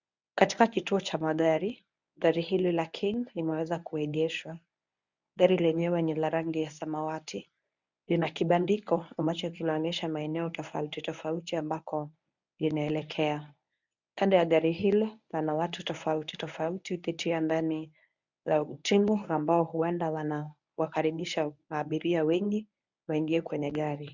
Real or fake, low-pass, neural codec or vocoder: fake; 7.2 kHz; codec, 24 kHz, 0.9 kbps, WavTokenizer, medium speech release version 1